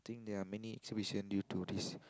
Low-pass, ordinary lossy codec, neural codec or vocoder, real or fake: none; none; none; real